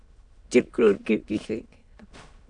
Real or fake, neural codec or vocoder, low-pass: fake; autoencoder, 22.05 kHz, a latent of 192 numbers a frame, VITS, trained on many speakers; 9.9 kHz